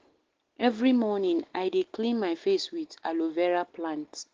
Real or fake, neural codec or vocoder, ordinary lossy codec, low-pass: real; none; Opus, 16 kbps; 7.2 kHz